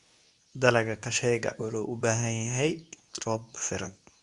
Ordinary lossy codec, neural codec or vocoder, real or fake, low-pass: Opus, 64 kbps; codec, 24 kHz, 0.9 kbps, WavTokenizer, medium speech release version 2; fake; 10.8 kHz